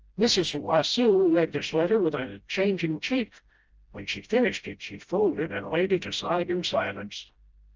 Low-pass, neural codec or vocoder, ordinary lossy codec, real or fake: 7.2 kHz; codec, 16 kHz, 0.5 kbps, FreqCodec, smaller model; Opus, 24 kbps; fake